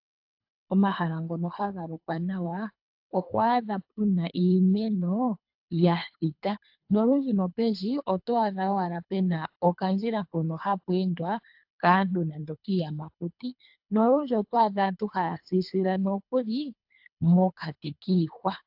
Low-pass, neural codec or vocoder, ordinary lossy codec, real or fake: 5.4 kHz; codec, 24 kHz, 3 kbps, HILCodec; AAC, 48 kbps; fake